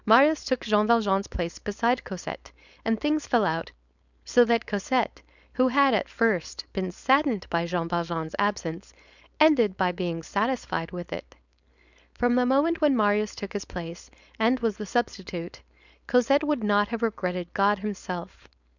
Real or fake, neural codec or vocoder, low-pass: fake; codec, 16 kHz, 4.8 kbps, FACodec; 7.2 kHz